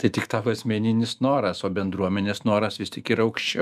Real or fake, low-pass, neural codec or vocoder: fake; 14.4 kHz; autoencoder, 48 kHz, 128 numbers a frame, DAC-VAE, trained on Japanese speech